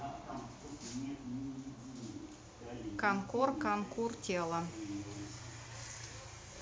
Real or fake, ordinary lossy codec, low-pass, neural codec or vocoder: real; none; none; none